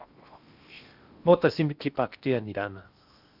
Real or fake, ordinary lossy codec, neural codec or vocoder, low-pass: fake; Opus, 64 kbps; codec, 16 kHz in and 24 kHz out, 0.8 kbps, FocalCodec, streaming, 65536 codes; 5.4 kHz